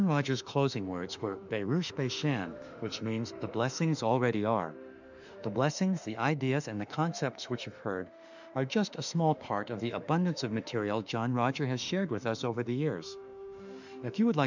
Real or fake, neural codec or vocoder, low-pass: fake; autoencoder, 48 kHz, 32 numbers a frame, DAC-VAE, trained on Japanese speech; 7.2 kHz